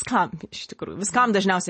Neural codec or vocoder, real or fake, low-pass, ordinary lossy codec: none; real; 10.8 kHz; MP3, 32 kbps